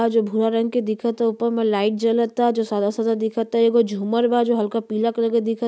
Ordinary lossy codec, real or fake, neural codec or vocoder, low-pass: none; real; none; none